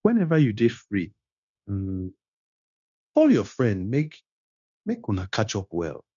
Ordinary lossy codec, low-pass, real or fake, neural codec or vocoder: none; 7.2 kHz; fake; codec, 16 kHz, 0.9 kbps, LongCat-Audio-Codec